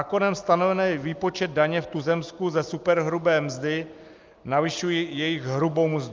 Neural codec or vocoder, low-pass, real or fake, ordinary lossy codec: none; 7.2 kHz; real; Opus, 24 kbps